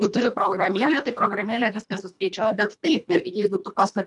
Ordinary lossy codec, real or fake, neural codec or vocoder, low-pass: MP3, 96 kbps; fake; codec, 24 kHz, 1.5 kbps, HILCodec; 10.8 kHz